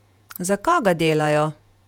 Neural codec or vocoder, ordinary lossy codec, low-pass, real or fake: vocoder, 48 kHz, 128 mel bands, Vocos; none; 19.8 kHz; fake